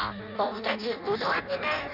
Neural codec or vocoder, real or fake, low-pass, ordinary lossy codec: codec, 16 kHz in and 24 kHz out, 0.6 kbps, FireRedTTS-2 codec; fake; 5.4 kHz; none